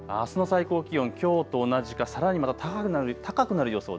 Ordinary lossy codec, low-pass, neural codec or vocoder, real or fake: none; none; none; real